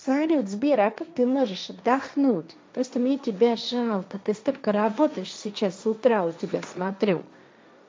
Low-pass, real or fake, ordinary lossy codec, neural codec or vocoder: none; fake; none; codec, 16 kHz, 1.1 kbps, Voila-Tokenizer